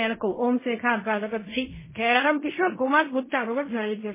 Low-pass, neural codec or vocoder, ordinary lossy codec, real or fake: 3.6 kHz; codec, 16 kHz in and 24 kHz out, 0.4 kbps, LongCat-Audio-Codec, fine tuned four codebook decoder; MP3, 16 kbps; fake